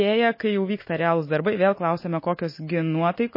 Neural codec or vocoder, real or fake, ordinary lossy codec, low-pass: none; real; MP3, 24 kbps; 5.4 kHz